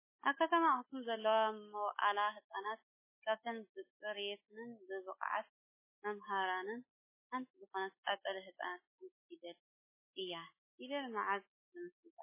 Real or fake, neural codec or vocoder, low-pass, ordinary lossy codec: fake; autoencoder, 48 kHz, 128 numbers a frame, DAC-VAE, trained on Japanese speech; 3.6 kHz; MP3, 16 kbps